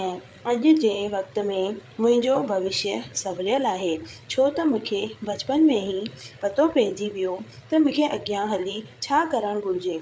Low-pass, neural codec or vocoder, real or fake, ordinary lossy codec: none; codec, 16 kHz, 8 kbps, FreqCodec, larger model; fake; none